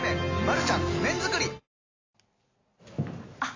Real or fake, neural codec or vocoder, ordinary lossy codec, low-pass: real; none; AAC, 32 kbps; 7.2 kHz